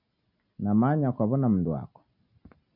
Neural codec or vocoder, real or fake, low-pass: none; real; 5.4 kHz